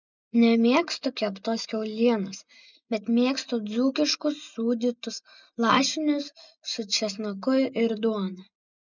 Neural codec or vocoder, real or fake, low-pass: none; real; 7.2 kHz